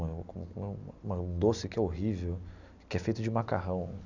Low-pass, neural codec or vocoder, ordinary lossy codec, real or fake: 7.2 kHz; none; none; real